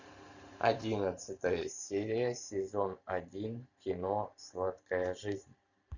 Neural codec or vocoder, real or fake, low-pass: vocoder, 44.1 kHz, 128 mel bands every 512 samples, BigVGAN v2; fake; 7.2 kHz